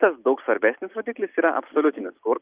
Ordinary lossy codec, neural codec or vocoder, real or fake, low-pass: Opus, 32 kbps; none; real; 3.6 kHz